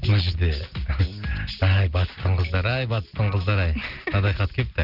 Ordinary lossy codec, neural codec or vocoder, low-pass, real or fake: Opus, 24 kbps; none; 5.4 kHz; real